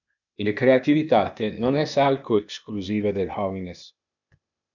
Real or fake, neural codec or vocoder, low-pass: fake; codec, 16 kHz, 0.8 kbps, ZipCodec; 7.2 kHz